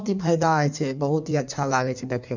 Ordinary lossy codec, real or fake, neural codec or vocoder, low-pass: none; fake; codec, 16 kHz in and 24 kHz out, 1.1 kbps, FireRedTTS-2 codec; 7.2 kHz